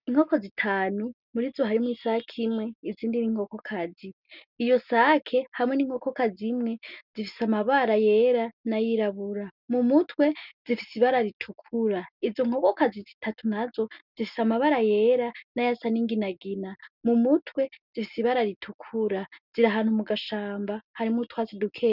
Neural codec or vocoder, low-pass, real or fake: none; 5.4 kHz; real